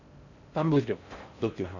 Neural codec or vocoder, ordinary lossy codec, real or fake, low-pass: codec, 16 kHz in and 24 kHz out, 0.6 kbps, FocalCodec, streaming, 4096 codes; none; fake; 7.2 kHz